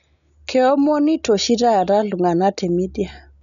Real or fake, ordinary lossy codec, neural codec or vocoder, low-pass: real; none; none; 7.2 kHz